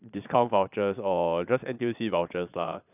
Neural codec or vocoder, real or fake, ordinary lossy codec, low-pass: vocoder, 22.05 kHz, 80 mel bands, Vocos; fake; none; 3.6 kHz